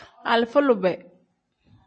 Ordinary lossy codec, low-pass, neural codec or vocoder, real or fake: MP3, 32 kbps; 9.9 kHz; none; real